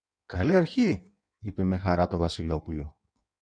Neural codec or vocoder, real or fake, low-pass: codec, 16 kHz in and 24 kHz out, 1.1 kbps, FireRedTTS-2 codec; fake; 9.9 kHz